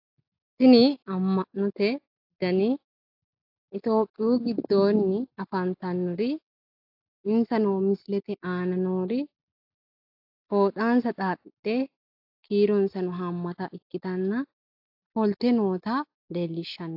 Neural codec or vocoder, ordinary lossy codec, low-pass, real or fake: none; AAC, 48 kbps; 5.4 kHz; real